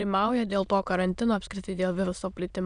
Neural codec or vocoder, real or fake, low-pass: autoencoder, 22.05 kHz, a latent of 192 numbers a frame, VITS, trained on many speakers; fake; 9.9 kHz